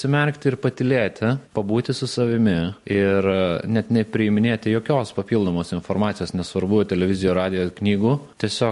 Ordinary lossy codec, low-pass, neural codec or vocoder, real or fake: MP3, 48 kbps; 14.4 kHz; none; real